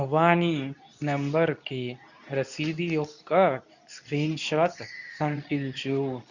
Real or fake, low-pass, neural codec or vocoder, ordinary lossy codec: fake; 7.2 kHz; codec, 24 kHz, 0.9 kbps, WavTokenizer, medium speech release version 2; none